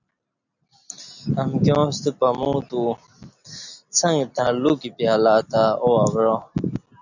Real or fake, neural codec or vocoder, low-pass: real; none; 7.2 kHz